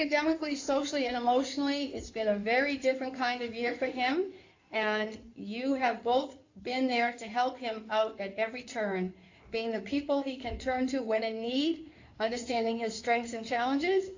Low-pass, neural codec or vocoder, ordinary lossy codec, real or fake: 7.2 kHz; codec, 16 kHz in and 24 kHz out, 2.2 kbps, FireRedTTS-2 codec; AAC, 48 kbps; fake